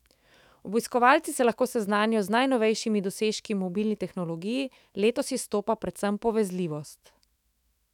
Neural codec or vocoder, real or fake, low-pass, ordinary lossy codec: autoencoder, 48 kHz, 128 numbers a frame, DAC-VAE, trained on Japanese speech; fake; 19.8 kHz; none